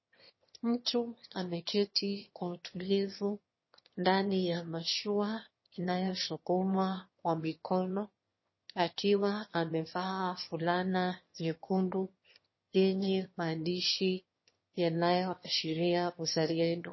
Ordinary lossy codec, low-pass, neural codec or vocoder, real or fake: MP3, 24 kbps; 7.2 kHz; autoencoder, 22.05 kHz, a latent of 192 numbers a frame, VITS, trained on one speaker; fake